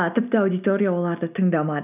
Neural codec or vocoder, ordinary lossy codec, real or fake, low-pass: none; none; real; 3.6 kHz